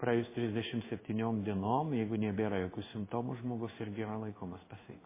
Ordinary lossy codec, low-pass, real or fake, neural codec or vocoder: MP3, 16 kbps; 3.6 kHz; fake; codec, 16 kHz in and 24 kHz out, 1 kbps, XY-Tokenizer